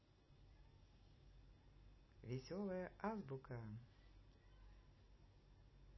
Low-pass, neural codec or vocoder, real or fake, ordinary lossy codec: 7.2 kHz; none; real; MP3, 24 kbps